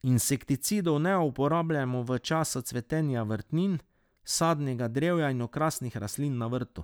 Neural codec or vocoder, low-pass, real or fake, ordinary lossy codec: none; none; real; none